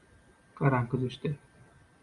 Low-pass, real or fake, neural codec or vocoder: 10.8 kHz; real; none